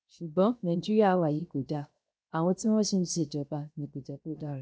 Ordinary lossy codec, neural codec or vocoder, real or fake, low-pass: none; codec, 16 kHz, 0.7 kbps, FocalCodec; fake; none